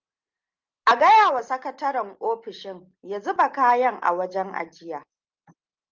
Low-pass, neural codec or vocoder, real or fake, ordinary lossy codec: 7.2 kHz; none; real; Opus, 32 kbps